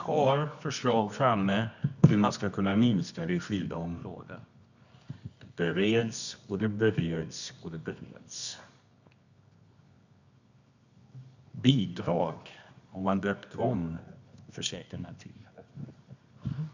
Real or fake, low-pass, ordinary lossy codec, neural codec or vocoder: fake; 7.2 kHz; none; codec, 24 kHz, 0.9 kbps, WavTokenizer, medium music audio release